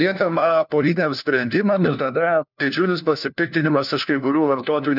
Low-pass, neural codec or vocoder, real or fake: 5.4 kHz; codec, 16 kHz, 1 kbps, FunCodec, trained on LibriTTS, 50 frames a second; fake